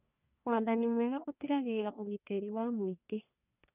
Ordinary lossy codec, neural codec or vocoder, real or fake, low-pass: none; codec, 44.1 kHz, 1.7 kbps, Pupu-Codec; fake; 3.6 kHz